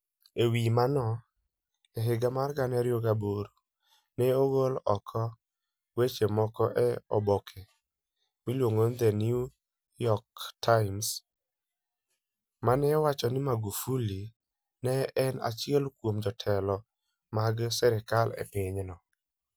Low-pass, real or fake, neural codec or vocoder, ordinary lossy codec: none; real; none; none